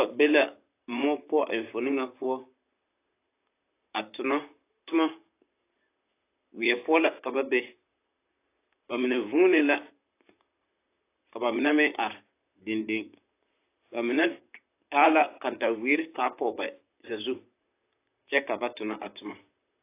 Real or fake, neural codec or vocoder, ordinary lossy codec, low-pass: fake; vocoder, 44.1 kHz, 128 mel bands, Pupu-Vocoder; AAC, 24 kbps; 3.6 kHz